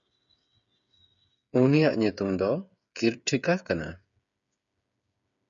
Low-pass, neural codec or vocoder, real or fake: 7.2 kHz; codec, 16 kHz, 8 kbps, FreqCodec, smaller model; fake